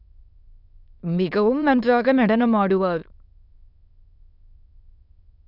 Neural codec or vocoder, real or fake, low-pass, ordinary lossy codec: autoencoder, 22.05 kHz, a latent of 192 numbers a frame, VITS, trained on many speakers; fake; 5.4 kHz; none